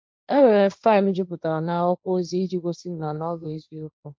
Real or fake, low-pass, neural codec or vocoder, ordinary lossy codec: fake; none; codec, 16 kHz, 1.1 kbps, Voila-Tokenizer; none